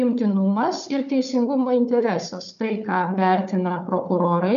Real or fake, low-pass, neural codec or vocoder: fake; 7.2 kHz; codec, 16 kHz, 4 kbps, FunCodec, trained on Chinese and English, 50 frames a second